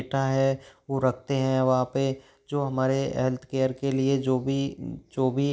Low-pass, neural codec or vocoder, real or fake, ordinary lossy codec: none; none; real; none